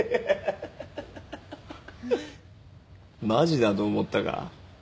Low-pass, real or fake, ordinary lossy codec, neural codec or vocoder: none; real; none; none